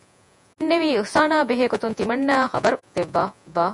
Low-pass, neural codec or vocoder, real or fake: 10.8 kHz; vocoder, 48 kHz, 128 mel bands, Vocos; fake